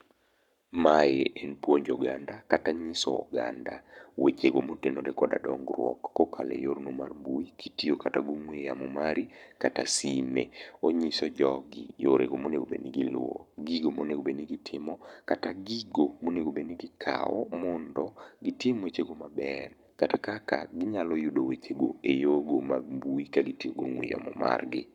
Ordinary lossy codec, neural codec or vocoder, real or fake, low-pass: none; codec, 44.1 kHz, 7.8 kbps, Pupu-Codec; fake; 19.8 kHz